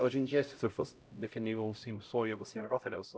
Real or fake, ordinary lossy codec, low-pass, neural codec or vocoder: fake; none; none; codec, 16 kHz, 0.5 kbps, X-Codec, HuBERT features, trained on LibriSpeech